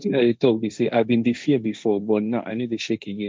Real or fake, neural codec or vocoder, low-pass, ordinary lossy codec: fake; codec, 16 kHz, 1.1 kbps, Voila-Tokenizer; none; none